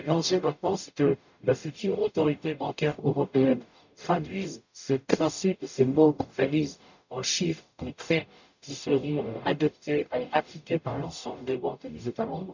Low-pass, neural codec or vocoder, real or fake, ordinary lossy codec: 7.2 kHz; codec, 44.1 kHz, 0.9 kbps, DAC; fake; none